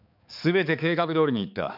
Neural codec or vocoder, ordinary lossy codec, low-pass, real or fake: codec, 16 kHz, 4 kbps, X-Codec, HuBERT features, trained on balanced general audio; none; 5.4 kHz; fake